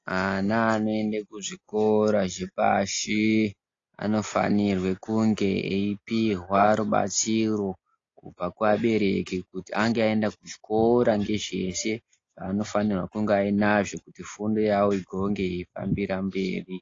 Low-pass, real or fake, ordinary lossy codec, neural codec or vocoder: 7.2 kHz; real; AAC, 32 kbps; none